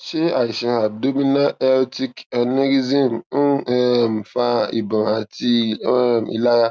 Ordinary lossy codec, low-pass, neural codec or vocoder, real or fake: none; none; none; real